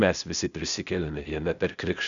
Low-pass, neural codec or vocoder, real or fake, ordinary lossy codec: 7.2 kHz; codec, 16 kHz, 0.8 kbps, ZipCodec; fake; MP3, 96 kbps